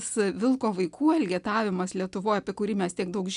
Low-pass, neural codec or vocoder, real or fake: 10.8 kHz; none; real